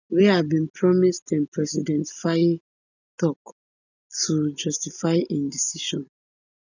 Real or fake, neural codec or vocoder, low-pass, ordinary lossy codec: real; none; 7.2 kHz; none